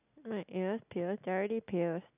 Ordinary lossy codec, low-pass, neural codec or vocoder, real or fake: none; 3.6 kHz; none; real